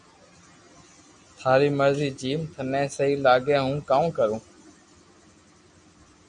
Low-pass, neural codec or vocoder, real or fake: 9.9 kHz; none; real